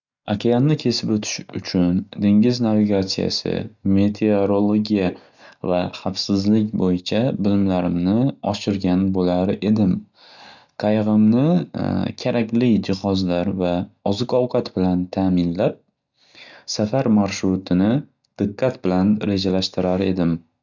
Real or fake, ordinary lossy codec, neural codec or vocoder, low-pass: real; none; none; 7.2 kHz